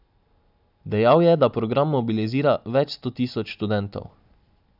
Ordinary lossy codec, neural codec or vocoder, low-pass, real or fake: none; none; 5.4 kHz; real